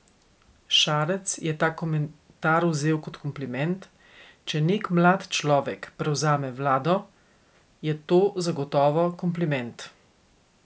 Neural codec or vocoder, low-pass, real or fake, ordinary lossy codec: none; none; real; none